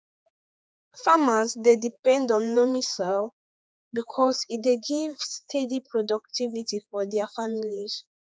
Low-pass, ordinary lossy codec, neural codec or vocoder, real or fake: none; none; codec, 16 kHz, 4 kbps, X-Codec, HuBERT features, trained on general audio; fake